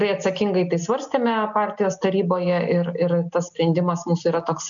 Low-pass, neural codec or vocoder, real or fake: 7.2 kHz; none; real